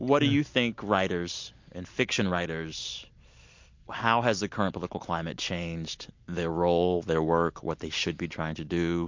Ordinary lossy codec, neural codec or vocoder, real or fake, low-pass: MP3, 48 kbps; none; real; 7.2 kHz